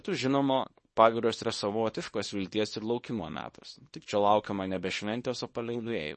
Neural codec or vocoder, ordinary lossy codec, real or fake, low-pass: codec, 24 kHz, 0.9 kbps, WavTokenizer, small release; MP3, 32 kbps; fake; 10.8 kHz